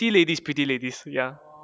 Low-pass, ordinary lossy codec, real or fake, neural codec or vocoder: none; none; real; none